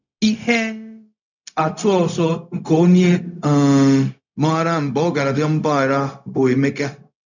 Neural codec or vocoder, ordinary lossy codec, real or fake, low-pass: codec, 16 kHz, 0.4 kbps, LongCat-Audio-Codec; none; fake; 7.2 kHz